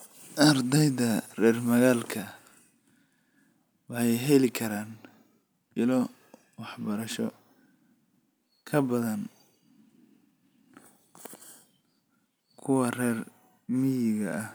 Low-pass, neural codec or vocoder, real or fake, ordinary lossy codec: none; none; real; none